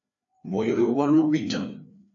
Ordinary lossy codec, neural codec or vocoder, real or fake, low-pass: AAC, 48 kbps; codec, 16 kHz, 2 kbps, FreqCodec, larger model; fake; 7.2 kHz